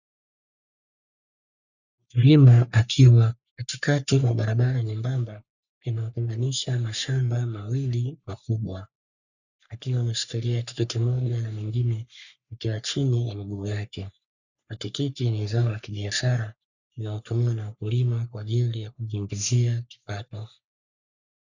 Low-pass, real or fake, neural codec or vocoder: 7.2 kHz; fake; codec, 44.1 kHz, 3.4 kbps, Pupu-Codec